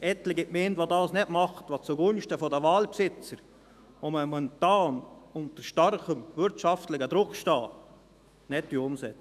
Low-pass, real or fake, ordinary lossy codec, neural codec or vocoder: 14.4 kHz; fake; none; autoencoder, 48 kHz, 128 numbers a frame, DAC-VAE, trained on Japanese speech